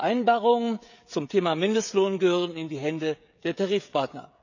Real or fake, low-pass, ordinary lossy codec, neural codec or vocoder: fake; 7.2 kHz; none; codec, 16 kHz, 16 kbps, FreqCodec, smaller model